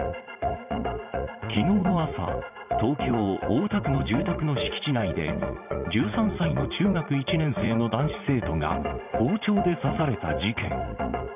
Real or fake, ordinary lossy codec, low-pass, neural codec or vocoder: fake; none; 3.6 kHz; vocoder, 44.1 kHz, 80 mel bands, Vocos